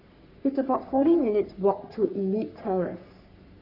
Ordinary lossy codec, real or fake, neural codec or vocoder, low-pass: none; fake; codec, 44.1 kHz, 3.4 kbps, Pupu-Codec; 5.4 kHz